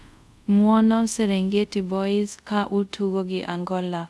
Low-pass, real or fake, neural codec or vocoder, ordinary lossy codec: none; fake; codec, 24 kHz, 0.5 kbps, DualCodec; none